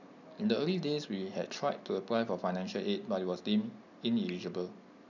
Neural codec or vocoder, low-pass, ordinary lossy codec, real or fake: none; 7.2 kHz; none; real